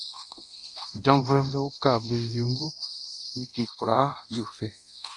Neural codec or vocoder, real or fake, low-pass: codec, 24 kHz, 0.9 kbps, DualCodec; fake; 10.8 kHz